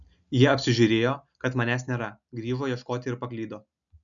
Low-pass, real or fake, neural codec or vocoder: 7.2 kHz; real; none